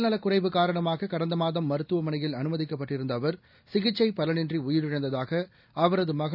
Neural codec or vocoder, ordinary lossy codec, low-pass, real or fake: none; none; 5.4 kHz; real